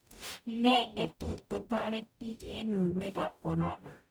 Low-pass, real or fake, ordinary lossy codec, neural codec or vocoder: none; fake; none; codec, 44.1 kHz, 0.9 kbps, DAC